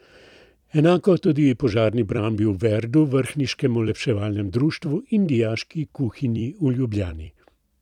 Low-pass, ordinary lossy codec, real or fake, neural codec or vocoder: 19.8 kHz; none; fake; vocoder, 44.1 kHz, 128 mel bands every 256 samples, BigVGAN v2